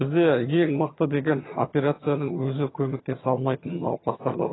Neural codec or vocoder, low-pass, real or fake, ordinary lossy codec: vocoder, 22.05 kHz, 80 mel bands, HiFi-GAN; 7.2 kHz; fake; AAC, 16 kbps